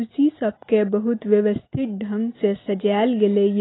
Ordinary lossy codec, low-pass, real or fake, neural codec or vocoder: AAC, 16 kbps; 7.2 kHz; real; none